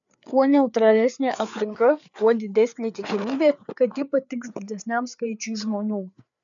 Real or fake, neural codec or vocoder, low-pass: fake; codec, 16 kHz, 4 kbps, FreqCodec, larger model; 7.2 kHz